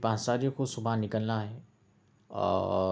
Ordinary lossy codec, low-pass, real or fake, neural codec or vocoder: none; none; real; none